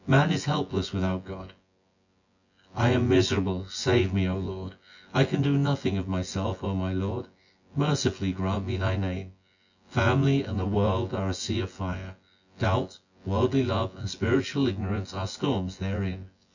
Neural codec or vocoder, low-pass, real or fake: vocoder, 24 kHz, 100 mel bands, Vocos; 7.2 kHz; fake